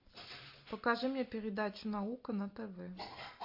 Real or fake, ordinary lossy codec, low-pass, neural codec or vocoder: real; MP3, 32 kbps; 5.4 kHz; none